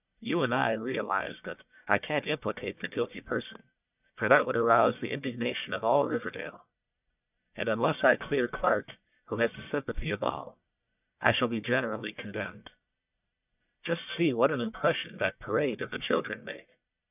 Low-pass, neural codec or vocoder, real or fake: 3.6 kHz; codec, 44.1 kHz, 1.7 kbps, Pupu-Codec; fake